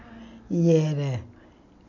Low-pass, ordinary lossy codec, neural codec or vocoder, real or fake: 7.2 kHz; none; none; real